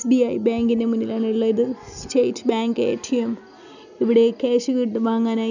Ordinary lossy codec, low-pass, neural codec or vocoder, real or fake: none; 7.2 kHz; none; real